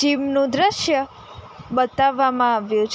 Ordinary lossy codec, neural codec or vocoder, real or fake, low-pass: none; none; real; none